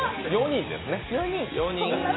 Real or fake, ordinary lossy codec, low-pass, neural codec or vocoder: real; AAC, 16 kbps; 7.2 kHz; none